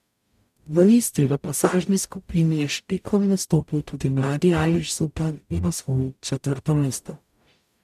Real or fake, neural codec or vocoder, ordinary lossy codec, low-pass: fake; codec, 44.1 kHz, 0.9 kbps, DAC; MP3, 96 kbps; 14.4 kHz